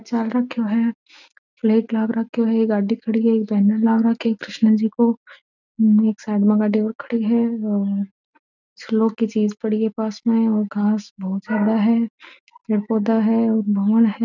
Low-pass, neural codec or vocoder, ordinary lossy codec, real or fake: 7.2 kHz; none; none; real